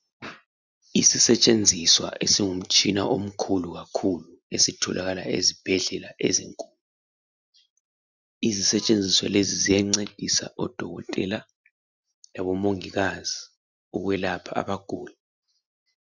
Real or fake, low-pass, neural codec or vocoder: real; 7.2 kHz; none